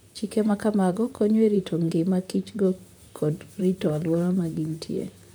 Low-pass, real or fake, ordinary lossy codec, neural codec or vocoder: none; fake; none; vocoder, 44.1 kHz, 128 mel bands, Pupu-Vocoder